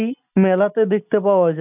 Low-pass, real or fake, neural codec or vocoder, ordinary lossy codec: 3.6 kHz; real; none; none